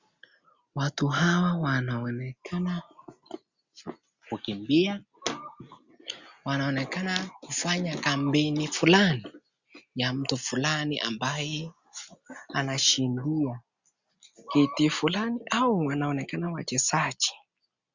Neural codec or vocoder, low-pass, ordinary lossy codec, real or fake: none; 7.2 kHz; Opus, 64 kbps; real